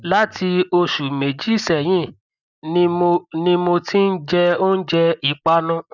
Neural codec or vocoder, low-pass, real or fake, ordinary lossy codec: none; 7.2 kHz; real; none